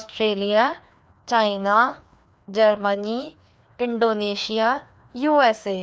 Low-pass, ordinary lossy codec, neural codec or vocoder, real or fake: none; none; codec, 16 kHz, 2 kbps, FreqCodec, larger model; fake